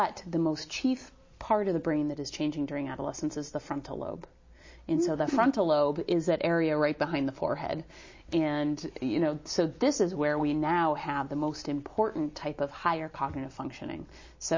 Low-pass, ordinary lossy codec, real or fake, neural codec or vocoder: 7.2 kHz; MP3, 32 kbps; real; none